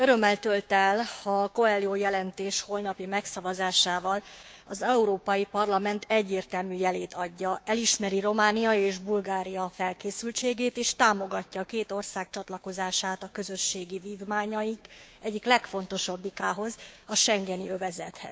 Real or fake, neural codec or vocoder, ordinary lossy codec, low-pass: fake; codec, 16 kHz, 6 kbps, DAC; none; none